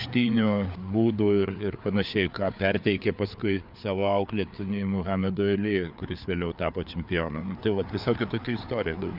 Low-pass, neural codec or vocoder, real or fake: 5.4 kHz; codec, 16 kHz, 4 kbps, FreqCodec, larger model; fake